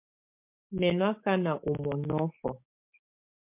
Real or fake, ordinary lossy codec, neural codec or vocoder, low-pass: fake; MP3, 32 kbps; codec, 16 kHz, 6 kbps, DAC; 3.6 kHz